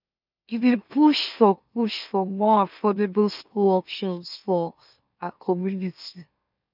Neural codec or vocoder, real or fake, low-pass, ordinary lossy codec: autoencoder, 44.1 kHz, a latent of 192 numbers a frame, MeloTTS; fake; 5.4 kHz; none